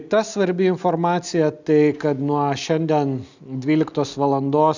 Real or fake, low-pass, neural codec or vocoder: real; 7.2 kHz; none